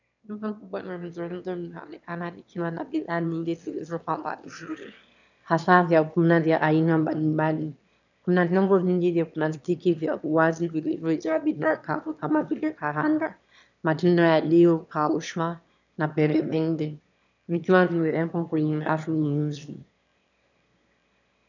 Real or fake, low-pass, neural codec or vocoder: fake; 7.2 kHz; autoencoder, 22.05 kHz, a latent of 192 numbers a frame, VITS, trained on one speaker